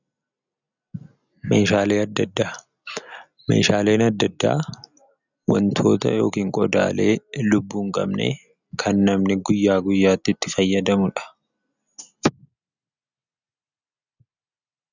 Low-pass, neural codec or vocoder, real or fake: 7.2 kHz; none; real